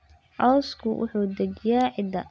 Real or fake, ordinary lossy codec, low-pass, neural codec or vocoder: real; none; none; none